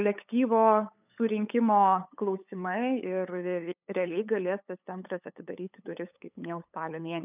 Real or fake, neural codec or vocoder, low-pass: fake; codec, 16 kHz, 8 kbps, FunCodec, trained on LibriTTS, 25 frames a second; 3.6 kHz